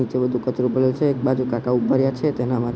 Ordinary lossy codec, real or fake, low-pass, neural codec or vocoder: none; real; none; none